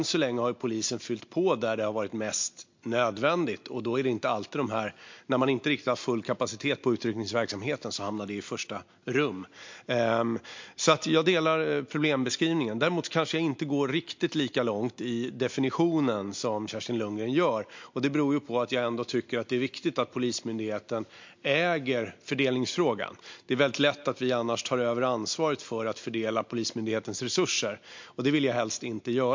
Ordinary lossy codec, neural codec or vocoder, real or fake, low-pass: MP3, 48 kbps; none; real; 7.2 kHz